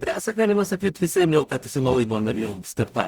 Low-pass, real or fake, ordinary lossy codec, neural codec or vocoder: 19.8 kHz; fake; Opus, 64 kbps; codec, 44.1 kHz, 0.9 kbps, DAC